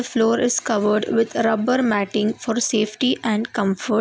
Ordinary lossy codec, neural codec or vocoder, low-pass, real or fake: none; none; none; real